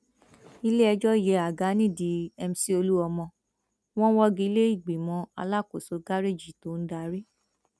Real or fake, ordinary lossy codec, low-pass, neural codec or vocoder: real; none; none; none